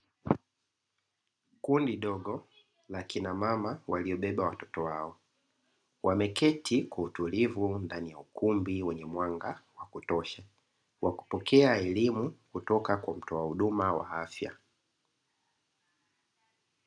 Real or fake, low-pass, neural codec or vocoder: real; 9.9 kHz; none